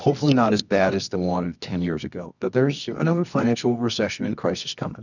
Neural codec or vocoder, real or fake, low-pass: codec, 24 kHz, 0.9 kbps, WavTokenizer, medium music audio release; fake; 7.2 kHz